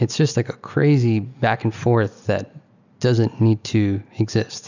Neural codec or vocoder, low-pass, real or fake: none; 7.2 kHz; real